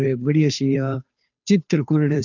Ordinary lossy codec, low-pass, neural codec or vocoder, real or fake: none; 7.2 kHz; codec, 16 kHz, 2 kbps, FunCodec, trained on Chinese and English, 25 frames a second; fake